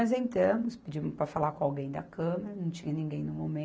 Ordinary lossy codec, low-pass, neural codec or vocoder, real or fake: none; none; none; real